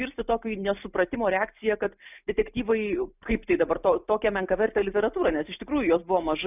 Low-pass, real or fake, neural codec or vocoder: 3.6 kHz; real; none